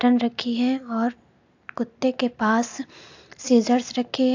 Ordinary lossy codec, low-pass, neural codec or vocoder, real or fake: AAC, 48 kbps; 7.2 kHz; none; real